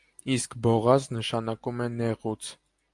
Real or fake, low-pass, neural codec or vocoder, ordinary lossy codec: real; 10.8 kHz; none; Opus, 32 kbps